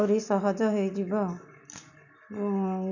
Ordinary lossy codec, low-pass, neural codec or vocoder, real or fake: none; 7.2 kHz; none; real